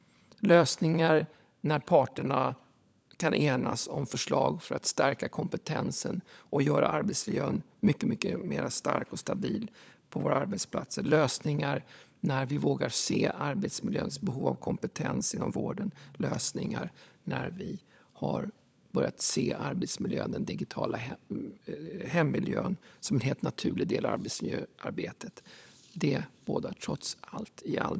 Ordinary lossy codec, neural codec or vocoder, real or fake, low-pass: none; codec, 16 kHz, 16 kbps, FunCodec, trained on LibriTTS, 50 frames a second; fake; none